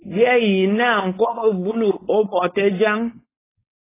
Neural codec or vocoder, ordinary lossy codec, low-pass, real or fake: codec, 16 kHz, 4.8 kbps, FACodec; AAC, 16 kbps; 3.6 kHz; fake